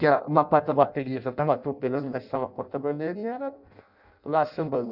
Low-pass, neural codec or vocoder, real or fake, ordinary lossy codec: 5.4 kHz; codec, 16 kHz in and 24 kHz out, 0.6 kbps, FireRedTTS-2 codec; fake; none